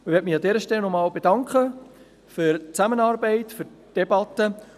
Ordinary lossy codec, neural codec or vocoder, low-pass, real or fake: none; none; 14.4 kHz; real